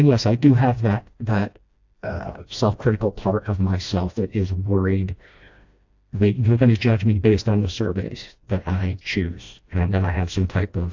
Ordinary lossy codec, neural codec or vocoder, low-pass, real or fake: AAC, 48 kbps; codec, 16 kHz, 1 kbps, FreqCodec, smaller model; 7.2 kHz; fake